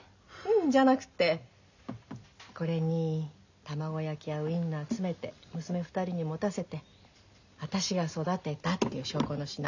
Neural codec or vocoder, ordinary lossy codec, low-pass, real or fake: none; none; 7.2 kHz; real